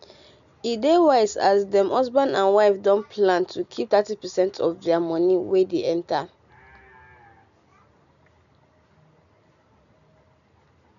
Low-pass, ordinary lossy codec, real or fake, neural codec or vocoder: 7.2 kHz; none; real; none